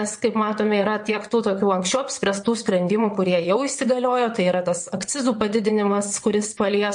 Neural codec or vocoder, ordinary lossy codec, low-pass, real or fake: vocoder, 22.05 kHz, 80 mel bands, WaveNeXt; MP3, 48 kbps; 9.9 kHz; fake